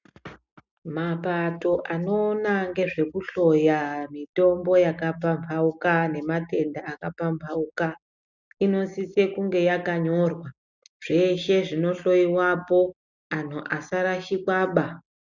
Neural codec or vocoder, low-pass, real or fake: none; 7.2 kHz; real